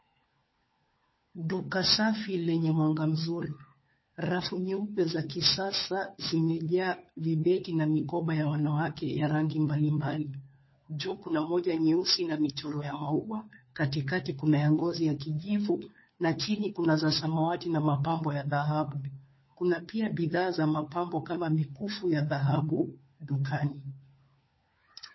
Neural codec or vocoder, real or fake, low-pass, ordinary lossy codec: codec, 16 kHz, 4 kbps, FunCodec, trained on LibriTTS, 50 frames a second; fake; 7.2 kHz; MP3, 24 kbps